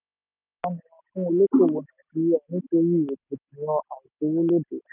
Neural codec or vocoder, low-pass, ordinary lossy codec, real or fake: none; 3.6 kHz; none; real